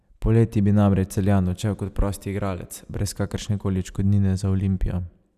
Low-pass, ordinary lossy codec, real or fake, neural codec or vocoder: 14.4 kHz; none; real; none